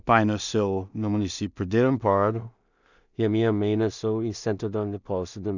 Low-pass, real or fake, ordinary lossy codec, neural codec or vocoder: 7.2 kHz; fake; none; codec, 16 kHz in and 24 kHz out, 0.4 kbps, LongCat-Audio-Codec, two codebook decoder